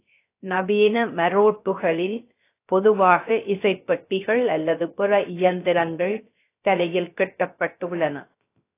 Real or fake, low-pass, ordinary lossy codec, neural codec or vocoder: fake; 3.6 kHz; AAC, 24 kbps; codec, 16 kHz, 0.3 kbps, FocalCodec